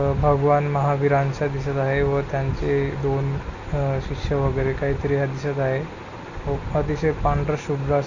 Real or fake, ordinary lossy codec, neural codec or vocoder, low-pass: real; none; none; 7.2 kHz